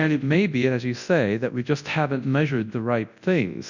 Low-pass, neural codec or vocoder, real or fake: 7.2 kHz; codec, 24 kHz, 0.9 kbps, WavTokenizer, large speech release; fake